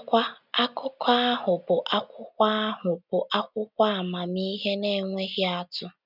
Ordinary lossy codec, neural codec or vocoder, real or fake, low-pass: none; none; real; 5.4 kHz